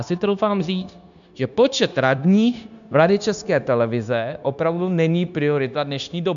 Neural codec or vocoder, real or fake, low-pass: codec, 16 kHz, 0.9 kbps, LongCat-Audio-Codec; fake; 7.2 kHz